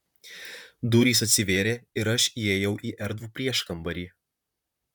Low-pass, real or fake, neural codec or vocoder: 19.8 kHz; fake; vocoder, 44.1 kHz, 128 mel bands, Pupu-Vocoder